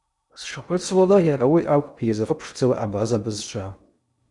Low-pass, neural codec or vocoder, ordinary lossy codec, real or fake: 10.8 kHz; codec, 16 kHz in and 24 kHz out, 0.6 kbps, FocalCodec, streaming, 2048 codes; Opus, 64 kbps; fake